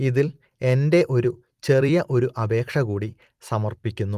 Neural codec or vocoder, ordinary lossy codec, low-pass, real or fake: vocoder, 44.1 kHz, 128 mel bands every 256 samples, BigVGAN v2; Opus, 24 kbps; 14.4 kHz; fake